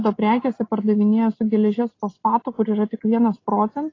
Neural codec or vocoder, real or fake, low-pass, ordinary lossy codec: none; real; 7.2 kHz; AAC, 32 kbps